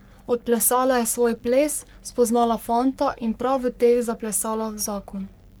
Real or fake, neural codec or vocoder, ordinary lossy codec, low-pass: fake; codec, 44.1 kHz, 3.4 kbps, Pupu-Codec; none; none